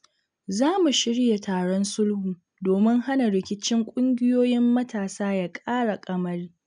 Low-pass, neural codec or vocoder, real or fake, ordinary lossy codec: 9.9 kHz; none; real; none